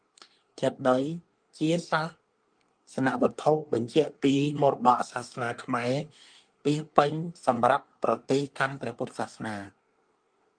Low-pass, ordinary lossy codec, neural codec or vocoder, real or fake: 9.9 kHz; Opus, 32 kbps; codec, 24 kHz, 1 kbps, SNAC; fake